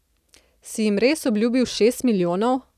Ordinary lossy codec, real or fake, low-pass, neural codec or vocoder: none; real; 14.4 kHz; none